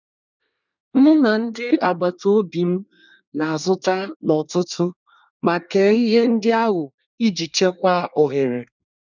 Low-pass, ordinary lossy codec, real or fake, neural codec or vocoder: 7.2 kHz; none; fake; codec, 24 kHz, 1 kbps, SNAC